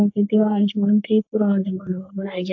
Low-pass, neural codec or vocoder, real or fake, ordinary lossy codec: 7.2 kHz; codec, 44.1 kHz, 3.4 kbps, Pupu-Codec; fake; AAC, 48 kbps